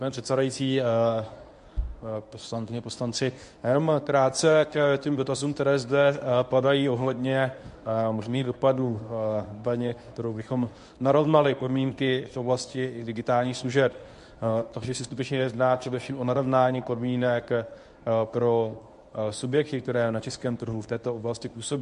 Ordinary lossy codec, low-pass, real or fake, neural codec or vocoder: MP3, 64 kbps; 10.8 kHz; fake; codec, 24 kHz, 0.9 kbps, WavTokenizer, medium speech release version 1